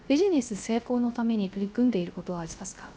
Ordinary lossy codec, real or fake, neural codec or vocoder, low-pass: none; fake; codec, 16 kHz, 0.3 kbps, FocalCodec; none